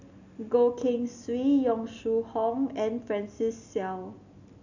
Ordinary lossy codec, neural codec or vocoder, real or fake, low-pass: none; none; real; 7.2 kHz